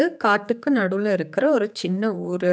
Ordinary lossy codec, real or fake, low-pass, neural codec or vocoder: none; fake; none; codec, 16 kHz, 4 kbps, X-Codec, HuBERT features, trained on general audio